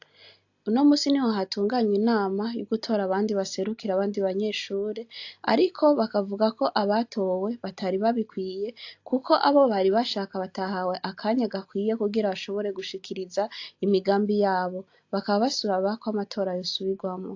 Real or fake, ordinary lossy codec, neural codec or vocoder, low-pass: real; AAC, 48 kbps; none; 7.2 kHz